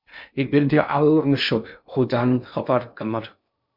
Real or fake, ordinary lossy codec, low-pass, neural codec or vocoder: fake; MP3, 48 kbps; 5.4 kHz; codec, 16 kHz in and 24 kHz out, 0.6 kbps, FocalCodec, streaming, 2048 codes